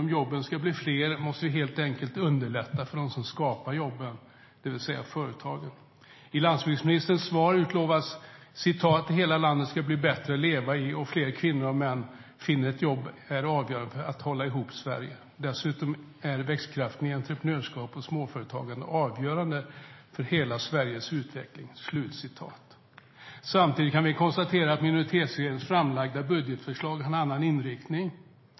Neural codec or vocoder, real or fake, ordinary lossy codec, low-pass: none; real; MP3, 24 kbps; 7.2 kHz